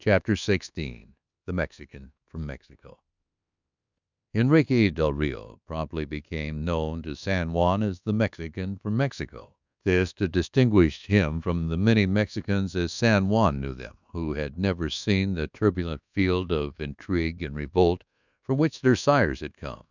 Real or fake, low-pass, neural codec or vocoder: fake; 7.2 kHz; codec, 24 kHz, 1.2 kbps, DualCodec